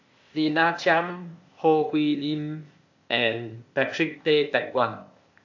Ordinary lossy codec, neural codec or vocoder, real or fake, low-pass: AAC, 48 kbps; codec, 16 kHz, 0.8 kbps, ZipCodec; fake; 7.2 kHz